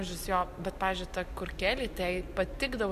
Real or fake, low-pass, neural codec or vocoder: real; 14.4 kHz; none